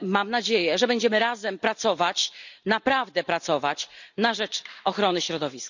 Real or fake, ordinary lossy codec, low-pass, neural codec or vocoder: real; none; 7.2 kHz; none